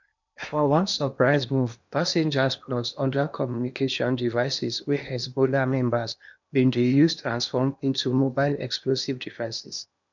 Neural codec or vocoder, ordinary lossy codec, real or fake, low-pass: codec, 16 kHz in and 24 kHz out, 0.8 kbps, FocalCodec, streaming, 65536 codes; none; fake; 7.2 kHz